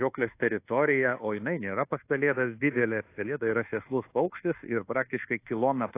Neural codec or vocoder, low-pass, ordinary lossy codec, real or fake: codec, 24 kHz, 1.2 kbps, DualCodec; 3.6 kHz; AAC, 24 kbps; fake